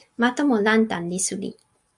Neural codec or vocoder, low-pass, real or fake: none; 10.8 kHz; real